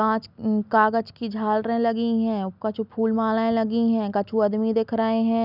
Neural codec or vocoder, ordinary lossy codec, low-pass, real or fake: none; none; 5.4 kHz; real